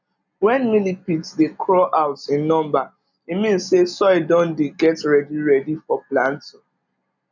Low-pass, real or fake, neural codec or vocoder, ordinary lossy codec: 7.2 kHz; real; none; none